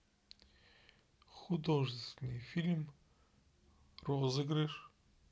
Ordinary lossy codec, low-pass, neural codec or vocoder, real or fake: none; none; none; real